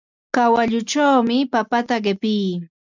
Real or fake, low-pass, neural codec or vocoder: real; 7.2 kHz; none